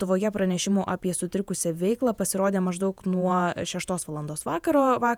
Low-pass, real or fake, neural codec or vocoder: 19.8 kHz; fake; vocoder, 48 kHz, 128 mel bands, Vocos